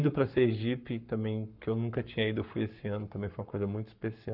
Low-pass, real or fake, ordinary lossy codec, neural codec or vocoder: 5.4 kHz; fake; none; codec, 44.1 kHz, 7.8 kbps, Pupu-Codec